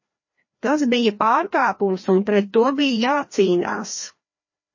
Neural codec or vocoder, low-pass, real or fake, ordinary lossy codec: codec, 16 kHz, 1 kbps, FreqCodec, larger model; 7.2 kHz; fake; MP3, 32 kbps